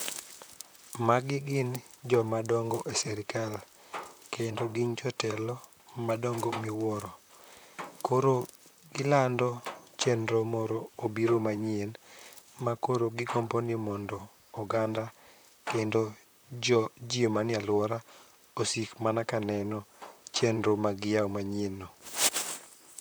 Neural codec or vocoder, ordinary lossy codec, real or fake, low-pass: vocoder, 44.1 kHz, 128 mel bands, Pupu-Vocoder; none; fake; none